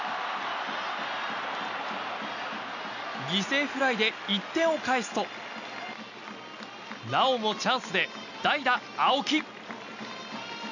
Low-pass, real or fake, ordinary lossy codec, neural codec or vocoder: 7.2 kHz; real; none; none